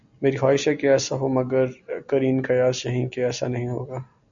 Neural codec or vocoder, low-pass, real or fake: none; 7.2 kHz; real